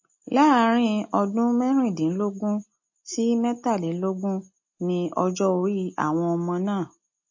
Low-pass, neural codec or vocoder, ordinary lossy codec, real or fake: 7.2 kHz; none; MP3, 32 kbps; real